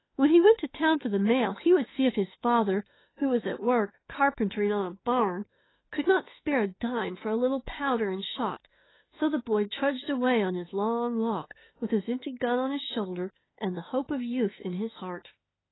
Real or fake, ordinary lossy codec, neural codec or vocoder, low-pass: fake; AAC, 16 kbps; autoencoder, 48 kHz, 32 numbers a frame, DAC-VAE, trained on Japanese speech; 7.2 kHz